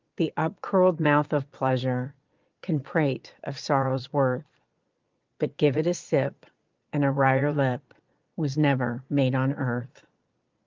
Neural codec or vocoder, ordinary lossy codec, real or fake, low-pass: vocoder, 22.05 kHz, 80 mel bands, Vocos; Opus, 32 kbps; fake; 7.2 kHz